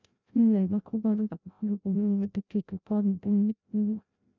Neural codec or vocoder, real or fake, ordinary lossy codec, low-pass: codec, 16 kHz, 0.5 kbps, FreqCodec, larger model; fake; none; 7.2 kHz